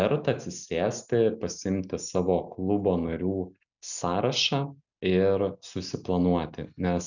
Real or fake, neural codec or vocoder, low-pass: real; none; 7.2 kHz